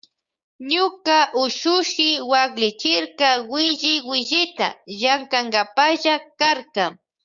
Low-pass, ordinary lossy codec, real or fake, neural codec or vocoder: 7.2 kHz; Opus, 64 kbps; fake; codec, 16 kHz, 6 kbps, DAC